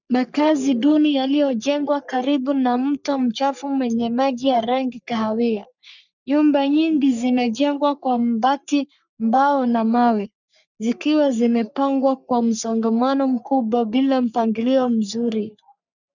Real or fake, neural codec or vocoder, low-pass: fake; codec, 44.1 kHz, 3.4 kbps, Pupu-Codec; 7.2 kHz